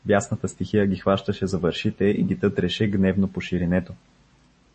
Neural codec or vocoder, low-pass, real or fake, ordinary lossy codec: none; 10.8 kHz; real; MP3, 32 kbps